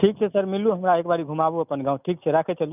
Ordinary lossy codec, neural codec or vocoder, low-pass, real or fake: none; none; 3.6 kHz; real